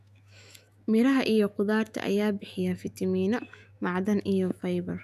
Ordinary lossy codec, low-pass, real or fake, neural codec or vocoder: none; 14.4 kHz; fake; autoencoder, 48 kHz, 128 numbers a frame, DAC-VAE, trained on Japanese speech